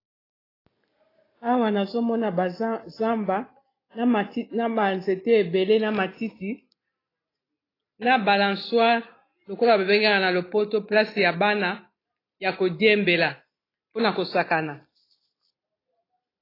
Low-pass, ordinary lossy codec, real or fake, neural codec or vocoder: 5.4 kHz; AAC, 24 kbps; real; none